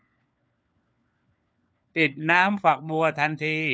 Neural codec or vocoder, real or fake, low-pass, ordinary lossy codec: codec, 16 kHz, 4 kbps, FunCodec, trained on LibriTTS, 50 frames a second; fake; none; none